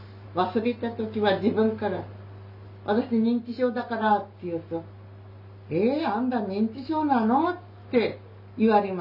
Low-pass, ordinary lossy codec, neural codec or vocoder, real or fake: 5.4 kHz; none; none; real